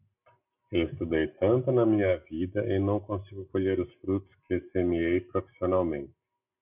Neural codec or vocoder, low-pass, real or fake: none; 3.6 kHz; real